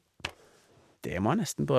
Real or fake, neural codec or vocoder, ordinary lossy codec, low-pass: real; none; none; 14.4 kHz